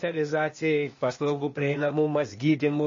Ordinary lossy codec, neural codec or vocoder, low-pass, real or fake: MP3, 32 kbps; codec, 16 kHz, 0.8 kbps, ZipCodec; 7.2 kHz; fake